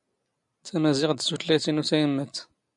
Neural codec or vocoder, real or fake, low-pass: none; real; 10.8 kHz